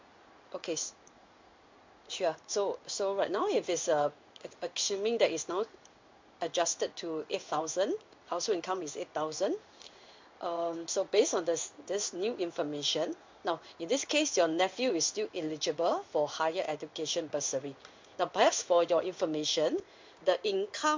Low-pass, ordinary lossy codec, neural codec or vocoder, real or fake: 7.2 kHz; MP3, 64 kbps; codec, 16 kHz in and 24 kHz out, 1 kbps, XY-Tokenizer; fake